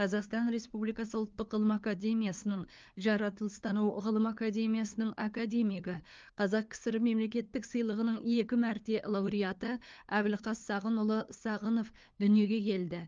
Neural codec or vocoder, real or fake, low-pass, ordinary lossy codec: codec, 16 kHz, 2 kbps, FunCodec, trained on LibriTTS, 25 frames a second; fake; 7.2 kHz; Opus, 24 kbps